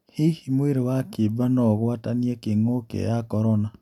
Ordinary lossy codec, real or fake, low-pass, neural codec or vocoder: none; real; 19.8 kHz; none